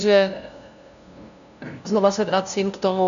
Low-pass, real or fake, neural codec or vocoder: 7.2 kHz; fake; codec, 16 kHz, 0.5 kbps, FunCodec, trained on LibriTTS, 25 frames a second